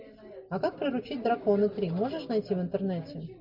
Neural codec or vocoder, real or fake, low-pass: none; real; 5.4 kHz